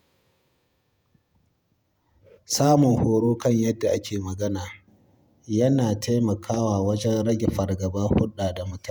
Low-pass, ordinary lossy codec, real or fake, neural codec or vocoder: none; none; fake; vocoder, 48 kHz, 128 mel bands, Vocos